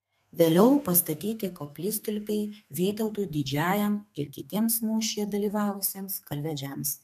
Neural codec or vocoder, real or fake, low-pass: codec, 32 kHz, 1.9 kbps, SNAC; fake; 14.4 kHz